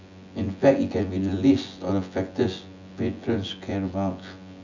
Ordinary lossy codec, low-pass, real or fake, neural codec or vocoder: none; 7.2 kHz; fake; vocoder, 24 kHz, 100 mel bands, Vocos